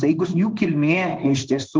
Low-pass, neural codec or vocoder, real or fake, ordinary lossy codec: 7.2 kHz; codec, 16 kHz in and 24 kHz out, 1 kbps, XY-Tokenizer; fake; Opus, 16 kbps